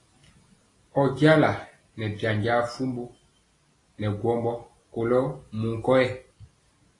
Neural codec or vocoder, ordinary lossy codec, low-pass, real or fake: none; AAC, 32 kbps; 10.8 kHz; real